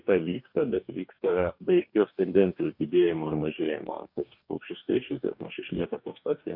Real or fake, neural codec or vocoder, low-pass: fake; codec, 44.1 kHz, 2.6 kbps, DAC; 5.4 kHz